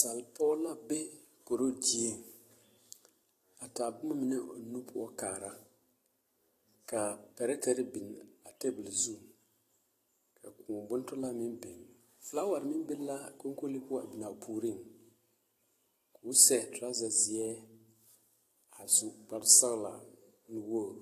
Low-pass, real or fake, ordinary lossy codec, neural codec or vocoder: 14.4 kHz; fake; AAC, 48 kbps; vocoder, 44.1 kHz, 128 mel bands every 256 samples, BigVGAN v2